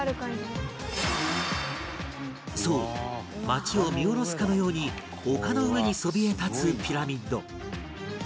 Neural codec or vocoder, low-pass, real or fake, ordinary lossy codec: none; none; real; none